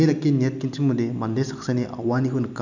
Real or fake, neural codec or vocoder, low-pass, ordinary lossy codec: real; none; 7.2 kHz; none